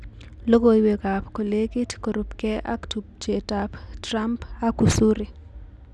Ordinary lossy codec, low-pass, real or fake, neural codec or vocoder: none; none; real; none